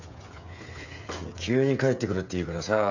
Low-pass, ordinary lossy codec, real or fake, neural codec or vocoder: 7.2 kHz; none; fake; codec, 16 kHz, 16 kbps, FreqCodec, smaller model